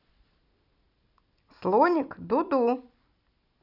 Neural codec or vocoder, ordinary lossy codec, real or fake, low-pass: none; none; real; 5.4 kHz